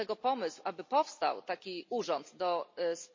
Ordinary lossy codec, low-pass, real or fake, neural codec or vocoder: MP3, 32 kbps; 7.2 kHz; real; none